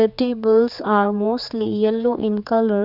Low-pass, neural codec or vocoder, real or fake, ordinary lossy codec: 5.4 kHz; codec, 16 kHz, 4 kbps, X-Codec, HuBERT features, trained on general audio; fake; none